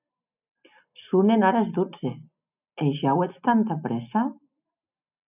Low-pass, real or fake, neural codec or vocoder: 3.6 kHz; real; none